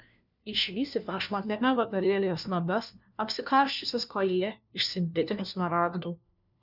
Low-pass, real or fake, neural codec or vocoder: 5.4 kHz; fake; codec, 16 kHz, 1 kbps, FunCodec, trained on LibriTTS, 50 frames a second